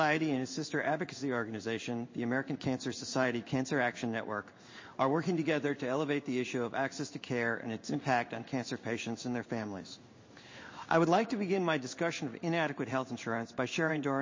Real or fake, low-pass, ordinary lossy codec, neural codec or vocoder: fake; 7.2 kHz; MP3, 32 kbps; codec, 16 kHz in and 24 kHz out, 1 kbps, XY-Tokenizer